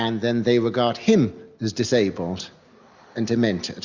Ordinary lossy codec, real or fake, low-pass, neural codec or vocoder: Opus, 64 kbps; real; 7.2 kHz; none